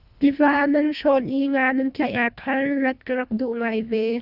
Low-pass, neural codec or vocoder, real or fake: 5.4 kHz; codec, 24 kHz, 1.5 kbps, HILCodec; fake